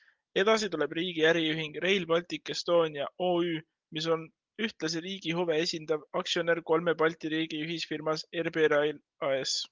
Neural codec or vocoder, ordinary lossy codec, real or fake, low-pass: none; Opus, 32 kbps; real; 7.2 kHz